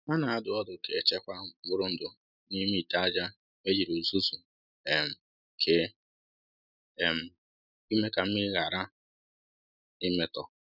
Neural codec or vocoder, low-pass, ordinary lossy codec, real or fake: none; 5.4 kHz; none; real